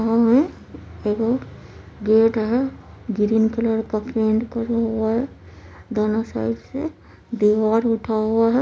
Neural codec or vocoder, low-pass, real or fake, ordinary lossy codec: none; none; real; none